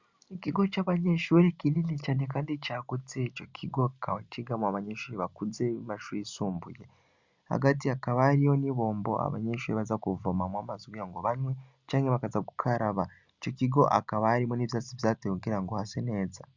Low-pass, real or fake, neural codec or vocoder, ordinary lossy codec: 7.2 kHz; real; none; Opus, 64 kbps